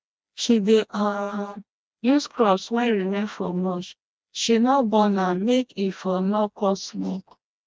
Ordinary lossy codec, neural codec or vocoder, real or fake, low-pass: none; codec, 16 kHz, 1 kbps, FreqCodec, smaller model; fake; none